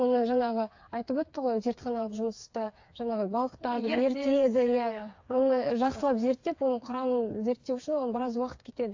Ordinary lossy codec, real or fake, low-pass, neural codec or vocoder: AAC, 48 kbps; fake; 7.2 kHz; codec, 16 kHz, 4 kbps, FreqCodec, smaller model